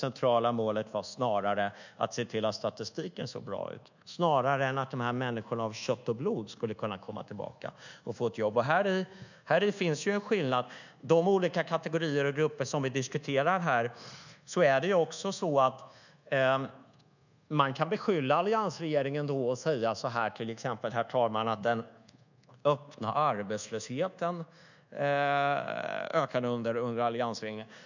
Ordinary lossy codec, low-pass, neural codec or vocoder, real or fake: none; 7.2 kHz; codec, 24 kHz, 1.2 kbps, DualCodec; fake